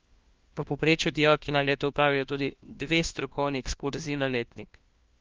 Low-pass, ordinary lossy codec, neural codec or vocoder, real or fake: 7.2 kHz; Opus, 24 kbps; codec, 16 kHz, 1 kbps, FunCodec, trained on LibriTTS, 50 frames a second; fake